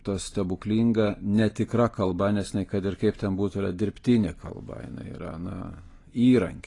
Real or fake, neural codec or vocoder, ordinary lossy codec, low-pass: real; none; AAC, 32 kbps; 10.8 kHz